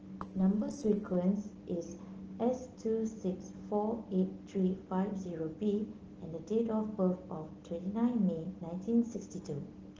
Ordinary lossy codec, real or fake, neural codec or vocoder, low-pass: Opus, 16 kbps; real; none; 7.2 kHz